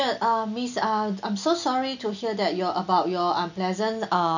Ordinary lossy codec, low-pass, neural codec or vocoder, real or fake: none; 7.2 kHz; none; real